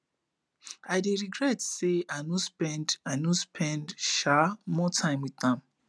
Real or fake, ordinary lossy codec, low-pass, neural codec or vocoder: real; none; none; none